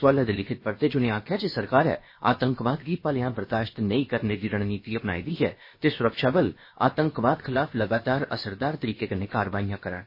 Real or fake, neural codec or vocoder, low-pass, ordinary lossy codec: fake; codec, 16 kHz, about 1 kbps, DyCAST, with the encoder's durations; 5.4 kHz; MP3, 24 kbps